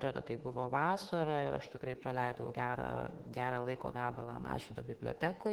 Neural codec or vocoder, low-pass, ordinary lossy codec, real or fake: autoencoder, 48 kHz, 32 numbers a frame, DAC-VAE, trained on Japanese speech; 19.8 kHz; Opus, 16 kbps; fake